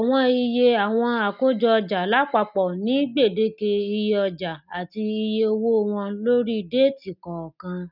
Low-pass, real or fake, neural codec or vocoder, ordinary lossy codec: 5.4 kHz; real; none; none